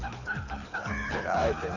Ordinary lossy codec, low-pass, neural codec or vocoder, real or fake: none; 7.2 kHz; codec, 24 kHz, 6 kbps, HILCodec; fake